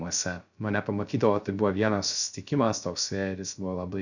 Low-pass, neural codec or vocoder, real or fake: 7.2 kHz; codec, 16 kHz, 0.3 kbps, FocalCodec; fake